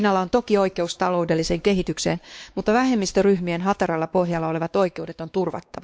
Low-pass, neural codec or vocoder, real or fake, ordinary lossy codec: none; codec, 16 kHz, 2 kbps, X-Codec, WavLM features, trained on Multilingual LibriSpeech; fake; none